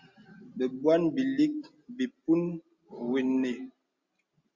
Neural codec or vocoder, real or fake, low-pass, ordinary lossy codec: none; real; 7.2 kHz; Opus, 64 kbps